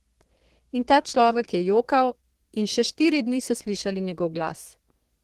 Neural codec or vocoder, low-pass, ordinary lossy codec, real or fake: codec, 32 kHz, 1.9 kbps, SNAC; 14.4 kHz; Opus, 16 kbps; fake